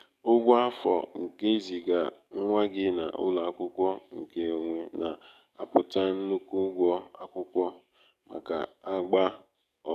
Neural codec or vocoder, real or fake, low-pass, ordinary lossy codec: codec, 44.1 kHz, 7.8 kbps, DAC; fake; 14.4 kHz; none